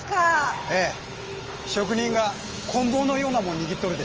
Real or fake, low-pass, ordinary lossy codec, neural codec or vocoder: fake; 7.2 kHz; Opus, 24 kbps; autoencoder, 48 kHz, 128 numbers a frame, DAC-VAE, trained on Japanese speech